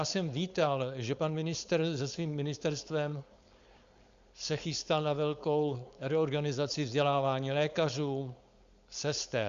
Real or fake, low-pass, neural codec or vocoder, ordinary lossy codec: fake; 7.2 kHz; codec, 16 kHz, 4.8 kbps, FACodec; Opus, 64 kbps